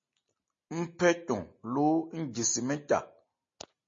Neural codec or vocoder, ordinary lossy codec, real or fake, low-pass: none; MP3, 32 kbps; real; 7.2 kHz